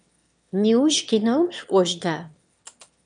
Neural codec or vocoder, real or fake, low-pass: autoencoder, 22.05 kHz, a latent of 192 numbers a frame, VITS, trained on one speaker; fake; 9.9 kHz